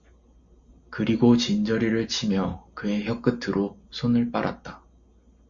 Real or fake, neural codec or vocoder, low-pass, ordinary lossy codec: real; none; 7.2 kHz; Opus, 64 kbps